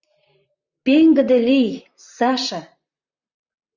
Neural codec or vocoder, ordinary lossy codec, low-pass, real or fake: vocoder, 44.1 kHz, 128 mel bands, Pupu-Vocoder; Opus, 64 kbps; 7.2 kHz; fake